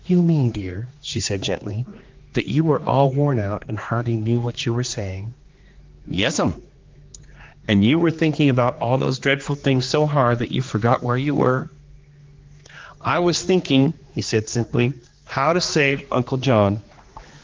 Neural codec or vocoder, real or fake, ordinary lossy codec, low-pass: codec, 16 kHz, 2 kbps, X-Codec, HuBERT features, trained on general audio; fake; Opus, 32 kbps; 7.2 kHz